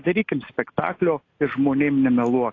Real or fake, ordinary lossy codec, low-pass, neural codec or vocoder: real; AAC, 32 kbps; 7.2 kHz; none